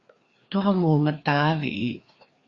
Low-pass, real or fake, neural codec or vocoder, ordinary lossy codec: 7.2 kHz; fake; codec, 16 kHz, 2 kbps, FreqCodec, larger model; Opus, 64 kbps